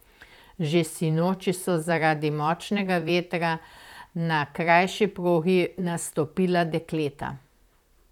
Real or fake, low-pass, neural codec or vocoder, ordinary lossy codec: fake; 19.8 kHz; vocoder, 44.1 kHz, 128 mel bands, Pupu-Vocoder; none